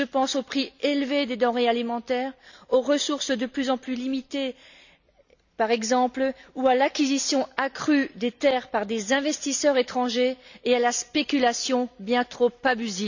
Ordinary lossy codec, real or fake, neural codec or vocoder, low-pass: none; real; none; 7.2 kHz